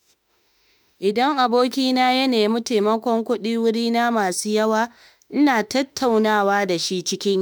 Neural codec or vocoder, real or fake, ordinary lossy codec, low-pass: autoencoder, 48 kHz, 32 numbers a frame, DAC-VAE, trained on Japanese speech; fake; none; none